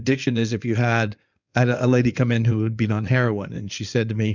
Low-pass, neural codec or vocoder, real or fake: 7.2 kHz; codec, 16 kHz in and 24 kHz out, 2.2 kbps, FireRedTTS-2 codec; fake